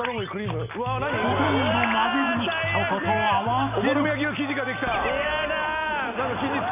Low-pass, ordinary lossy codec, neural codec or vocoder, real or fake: 3.6 kHz; none; none; real